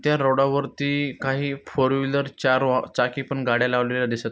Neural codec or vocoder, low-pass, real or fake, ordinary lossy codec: none; none; real; none